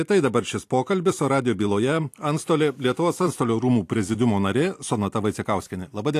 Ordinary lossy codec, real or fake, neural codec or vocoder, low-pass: AAC, 64 kbps; fake; vocoder, 44.1 kHz, 128 mel bands every 256 samples, BigVGAN v2; 14.4 kHz